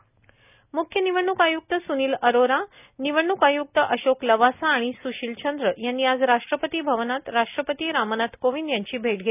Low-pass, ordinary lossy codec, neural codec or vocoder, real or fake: 3.6 kHz; none; none; real